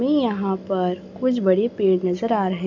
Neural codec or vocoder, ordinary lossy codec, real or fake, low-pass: none; none; real; 7.2 kHz